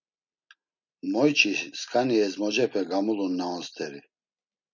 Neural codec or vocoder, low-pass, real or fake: none; 7.2 kHz; real